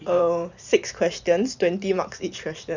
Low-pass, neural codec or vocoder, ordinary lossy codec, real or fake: 7.2 kHz; vocoder, 44.1 kHz, 128 mel bands every 512 samples, BigVGAN v2; none; fake